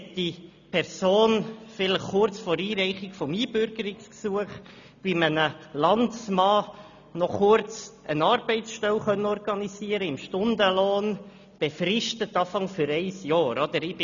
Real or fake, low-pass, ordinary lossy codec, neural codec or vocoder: real; 7.2 kHz; none; none